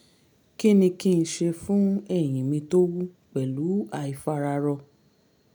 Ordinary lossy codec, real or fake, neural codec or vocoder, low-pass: none; real; none; none